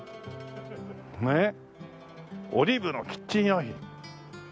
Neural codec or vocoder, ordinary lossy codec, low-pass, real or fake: none; none; none; real